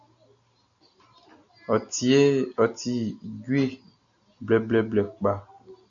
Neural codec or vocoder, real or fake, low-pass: none; real; 7.2 kHz